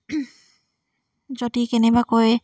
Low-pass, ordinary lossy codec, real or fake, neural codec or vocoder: none; none; real; none